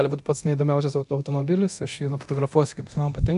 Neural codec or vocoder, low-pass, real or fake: codec, 24 kHz, 0.9 kbps, DualCodec; 10.8 kHz; fake